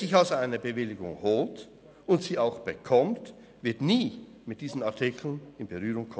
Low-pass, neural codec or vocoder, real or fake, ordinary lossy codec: none; none; real; none